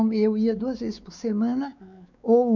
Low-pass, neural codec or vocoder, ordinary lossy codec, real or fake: 7.2 kHz; codec, 16 kHz, 4 kbps, X-Codec, WavLM features, trained on Multilingual LibriSpeech; none; fake